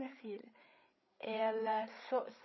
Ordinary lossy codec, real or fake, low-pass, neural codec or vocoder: MP3, 24 kbps; fake; 7.2 kHz; codec, 16 kHz, 8 kbps, FreqCodec, larger model